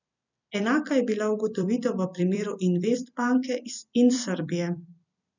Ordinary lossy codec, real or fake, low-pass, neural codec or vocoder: none; fake; 7.2 kHz; vocoder, 44.1 kHz, 128 mel bands every 256 samples, BigVGAN v2